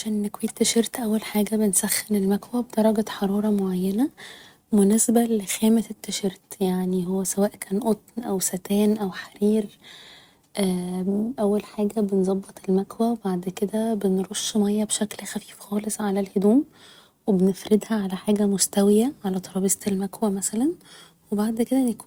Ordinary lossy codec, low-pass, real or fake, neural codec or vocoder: Opus, 64 kbps; 19.8 kHz; real; none